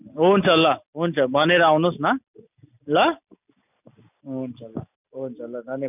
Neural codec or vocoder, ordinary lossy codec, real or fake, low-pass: none; none; real; 3.6 kHz